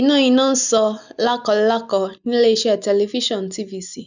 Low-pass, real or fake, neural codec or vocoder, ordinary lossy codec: 7.2 kHz; real; none; none